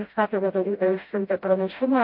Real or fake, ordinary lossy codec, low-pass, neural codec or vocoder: fake; MP3, 32 kbps; 5.4 kHz; codec, 16 kHz, 0.5 kbps, FreqCodec, smaller model